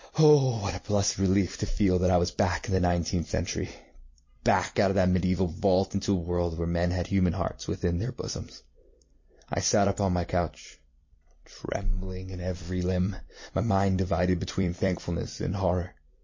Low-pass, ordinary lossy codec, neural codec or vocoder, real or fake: 7.2 kHz; MP3, 32 kbps; vocoder, 44.1 kHz, 128 mel bands every 256 samples, BigVGAN v2; fake